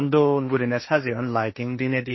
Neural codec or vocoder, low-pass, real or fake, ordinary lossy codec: codec, 16 kHz, 1.1 kbps, Voila-Tokenizer; 7.2 kHz; fake; MP3, 24 kbps